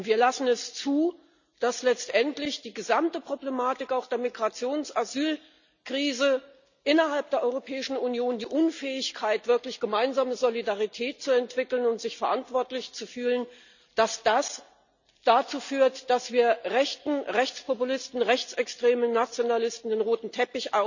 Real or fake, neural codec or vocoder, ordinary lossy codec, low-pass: real; none; none; 7.2 kHz